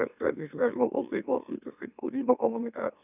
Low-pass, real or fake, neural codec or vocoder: 3.6 kHz; fake; autoencoder, 44.1 kHz, a latent of 192 numbers a frame, MeloTTS